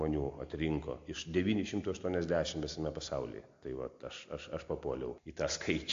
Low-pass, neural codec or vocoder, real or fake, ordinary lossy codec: 7.2 kHz; none; real; AAC, 48 kbps